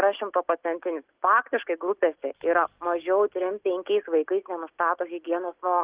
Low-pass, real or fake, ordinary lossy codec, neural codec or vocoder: 3.6 kHz; real; Opus, 32 kbps; none